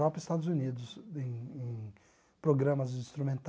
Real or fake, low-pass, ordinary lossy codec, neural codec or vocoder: real; none; none; none